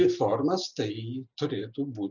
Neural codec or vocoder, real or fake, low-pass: none; real; 7.2 kHz